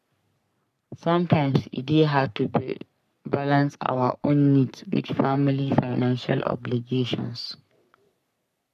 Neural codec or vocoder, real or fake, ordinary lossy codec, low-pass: codec, 44.1 kHz, 3.4 kbps, Pupu-Codec; fake; none; 14.4 kHz